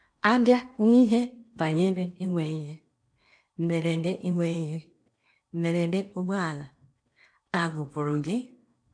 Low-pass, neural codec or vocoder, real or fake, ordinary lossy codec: 9.9 kHz; codec, 16 kHz in and 24 kHz out, 0.8 kbps, FocalCodec, streaming, 65536 codes; fake; AAC, 64 kbps